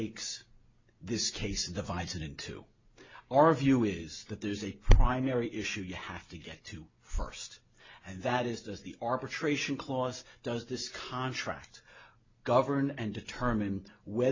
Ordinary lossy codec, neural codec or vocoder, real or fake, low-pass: MP3, 64 kbps; none; real; 7.2 kHz